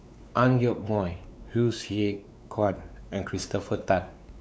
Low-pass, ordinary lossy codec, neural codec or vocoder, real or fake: none; none; codec, 16 kHz, 4 kbps, X-Codec, WavLM features, trained on Multilingual LibriSpeech; fake